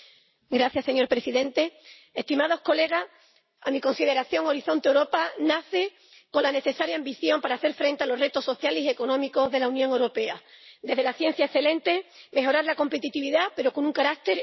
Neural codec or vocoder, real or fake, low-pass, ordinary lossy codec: none; real; 7.2 kHz; MP3, 24 kbps